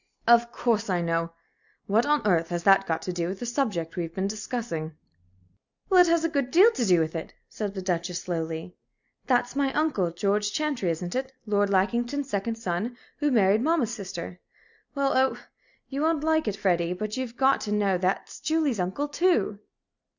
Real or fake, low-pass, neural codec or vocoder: real; 7.2 kHz; none